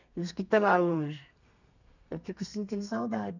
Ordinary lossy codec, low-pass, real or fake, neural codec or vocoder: none; 7.2 kHz; fake; codec, 32 kHz, 1.9 kbps, SNAC